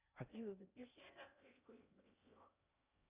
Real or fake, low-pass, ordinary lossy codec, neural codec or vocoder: fake; 3.6 kHz; Opus, 64 kbps; codec, 16 kHz in and 24 kHz out, 0.8 kbps, FocalCodec, streaming, 65536 codes